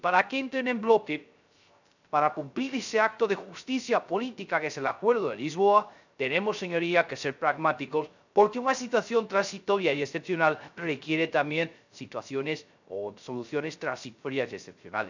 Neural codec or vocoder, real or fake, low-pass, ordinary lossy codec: codec, 16 kHz, 0.3 kbps, FocalCodec; fake; 7.2 kHz; none